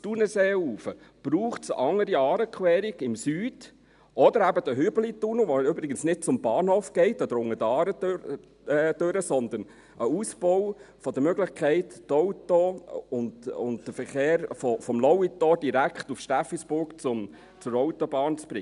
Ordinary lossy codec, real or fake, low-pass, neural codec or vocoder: none; real; 10.8 kHz; none